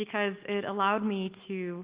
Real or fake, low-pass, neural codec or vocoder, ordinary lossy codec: real; 3.6 kHz; none; Opus, 32 kbps